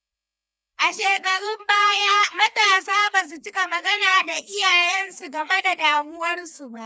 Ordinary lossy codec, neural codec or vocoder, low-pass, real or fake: none; codec, 16 kHz, 2 kbps, FreqCodec, larger model; none; fake